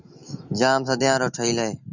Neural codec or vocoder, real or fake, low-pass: none; real; 7.2 kHz